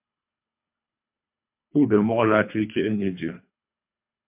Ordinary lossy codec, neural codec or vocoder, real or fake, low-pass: MP3, 32 kbps; codec, 24 kHz, 3 kbps, HILCodec; fake; 3.6 kHz